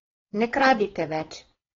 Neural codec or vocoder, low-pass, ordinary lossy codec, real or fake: codec, 16 kHz, 4.8 kbps, FACodec; 7.2 kHz; AAC, 24 kbps; fake